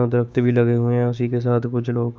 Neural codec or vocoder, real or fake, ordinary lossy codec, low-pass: codec, 16 kHz, 6 kbps, DAC; fake; none; none